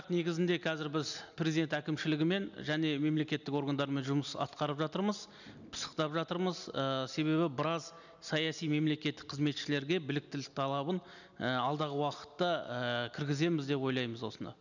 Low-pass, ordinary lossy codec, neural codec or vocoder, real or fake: 7.2 kHz; none; none; real